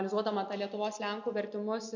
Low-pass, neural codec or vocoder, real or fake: 7.2 kHz; none; real